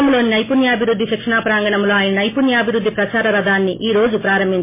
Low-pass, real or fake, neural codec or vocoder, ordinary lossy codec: 3.6 kHz; real; none; MP3, 16 kbps